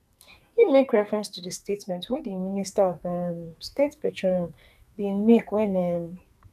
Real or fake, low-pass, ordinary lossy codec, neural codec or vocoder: fake; 14.4 kHz; none; codec, 44.1 kHz, 2.6 kbps, SNAC